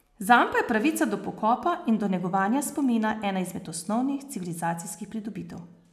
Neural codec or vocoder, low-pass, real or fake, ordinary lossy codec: none; 14.4 kHz; real; none